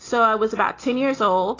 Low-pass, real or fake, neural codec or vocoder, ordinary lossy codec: 7.2 kHz; real; none; AAC, 32 kbps